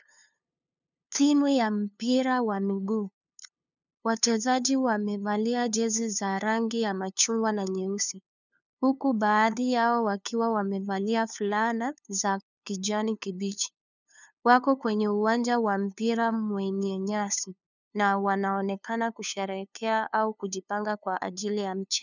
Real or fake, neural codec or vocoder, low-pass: fake; codec, 16 kHz, 2 kbps, FunCodec, trained on LibriTTS, 25 frames a second; 7.2 kHz